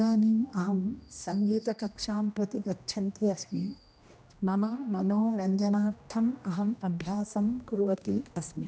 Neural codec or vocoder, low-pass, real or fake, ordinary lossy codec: codec, 16 kHz, 1 kbps, X-Codec, HuBERT features, trained on general audio; none; fake; none